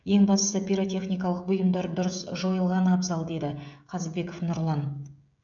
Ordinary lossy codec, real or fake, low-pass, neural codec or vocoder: none; fake; 7.2 kHz; codec, 16 kHz, 16 kbps, FreqCodec, smaller model